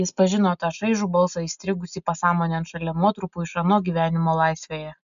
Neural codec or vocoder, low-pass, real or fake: none; 7.2 kHz; real